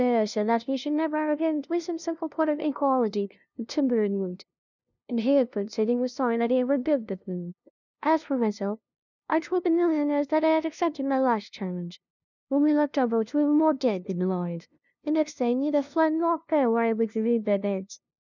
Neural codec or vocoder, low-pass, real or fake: codec, 16 kHz, 0.5 kbps, FunCodec, trained on LibriTTS, 25 frames a second; 7.2 kHz; fake